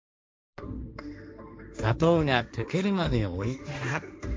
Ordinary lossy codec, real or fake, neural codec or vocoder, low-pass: none; fake; codec, 16 kHz, 1.1 kbps, Voila-Tokenizer; none